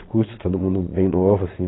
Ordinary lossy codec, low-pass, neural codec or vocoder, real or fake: AAC, 16 kbps; 7.2 kHz; vocoder, 22.05 kHz, 80 mel bands, Vocos; fake